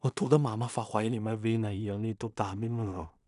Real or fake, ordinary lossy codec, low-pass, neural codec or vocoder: fake; none; 10.8 kHz; codec, 16 kHz in and 24 kHz out, 0.4 kbps, LongCat-Audio-Codec, two codebook decoder